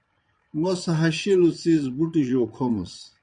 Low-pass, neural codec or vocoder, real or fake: 9.9 kHz; vocoder, 22.05 kHz, 80 mel bands, Vocos; fake